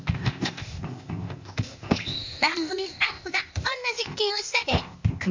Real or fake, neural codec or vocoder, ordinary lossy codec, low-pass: fake; codec, 16 kHz, 0.8 kbps, ZipCodec; MP3, 64 kbps; 7.2 kHz